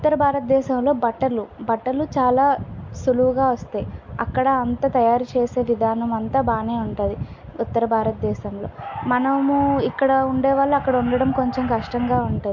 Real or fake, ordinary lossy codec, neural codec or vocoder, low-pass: real; MP3, 48 kbps; none; 7.2 kHz